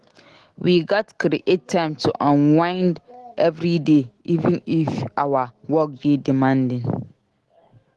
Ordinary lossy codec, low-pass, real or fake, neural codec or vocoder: Opus, 16 kbps; 10.8 kHz; fake; autoencoder, 48 kHz, 128 numbers a frame, DAC-VAE, trained on Japanese speech